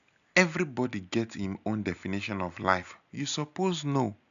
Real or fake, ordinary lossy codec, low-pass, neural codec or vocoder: real; none; 7.2 kHz; none